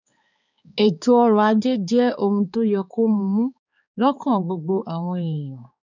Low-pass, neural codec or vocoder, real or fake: 7.2 kHz; codec, 16 kHz, 4 kbps, X-Codec, HuBERT features, trained on balanced general audio; fake